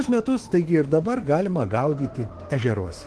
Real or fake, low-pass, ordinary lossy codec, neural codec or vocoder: fake; 10.8 kHz; Opus, 16 kbps; autoencoder, 48 kHz, 32 numbers a frame, DAC-VAE, trained on Japanese speech